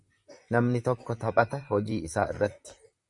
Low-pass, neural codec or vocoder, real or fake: 10.8 kHz; vocoder, 44.1 kHz, 128 mel bands, Pupu-Vocoder; fake